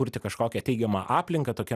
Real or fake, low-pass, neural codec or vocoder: real; 14.4 kHz; none